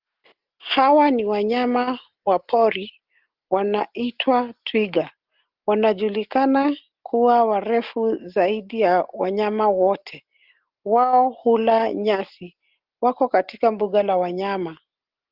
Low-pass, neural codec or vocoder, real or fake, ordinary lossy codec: 5.4 kHz; none; real; Opus, 32 kbps